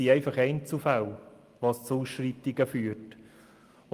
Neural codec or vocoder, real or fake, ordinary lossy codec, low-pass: none; real; Opus, 32 kbps; 14.4 kHz